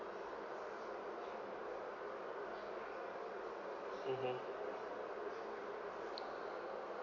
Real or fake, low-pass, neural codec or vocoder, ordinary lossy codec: real; 7.2 kHz; none; none